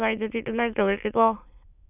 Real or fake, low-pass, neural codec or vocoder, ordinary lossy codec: fake; 3.6 kHz; autoencoder, 22.05 kHz, a latent of 192 numbers a frame, VITS, trained on many speakers; none